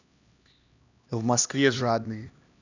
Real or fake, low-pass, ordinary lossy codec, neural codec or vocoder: fake; 7.2 kHz; none; codec, 16 kHz, 1 kbps, X-Codec, HuBERT features, trained on LibriSpeech